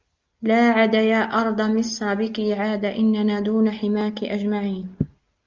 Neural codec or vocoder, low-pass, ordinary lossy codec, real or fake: none; 7.2 kHz; Opus, 32 kbps; real